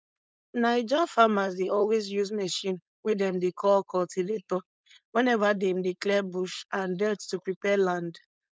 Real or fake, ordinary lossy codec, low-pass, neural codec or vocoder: fake; none; none; codec, 16 kHz, 4.8 kbps, FACodec